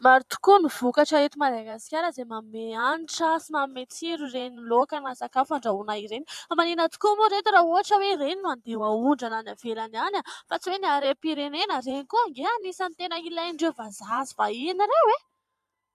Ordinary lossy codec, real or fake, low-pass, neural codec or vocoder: AAC, 96 kbps; fake; 14.4 kHz; vocoder, 44.1 kHz, 128 mel bands every 512 samples, BigVGAN v2